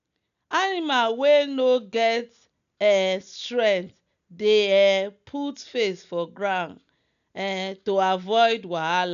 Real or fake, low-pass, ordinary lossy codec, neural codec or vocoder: real; 7.2 kHz; none; none